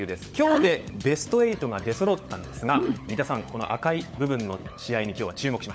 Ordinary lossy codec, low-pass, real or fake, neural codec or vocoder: none; none; fake; codec, 16 kHz, 16 kbps, FunCodec, trained on LibriTTS, 50 frames a second